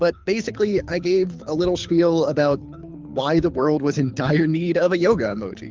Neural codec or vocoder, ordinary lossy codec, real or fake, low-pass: codec, 24 kHz, 6 kbps, HILCodec; Opus, 32 kbps; fake; 7.2 kHz